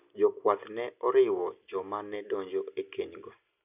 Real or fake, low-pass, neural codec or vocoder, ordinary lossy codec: real; 3.6 kHz; none; none